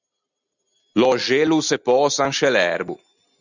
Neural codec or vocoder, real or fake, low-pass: none; real; 7.2 kHz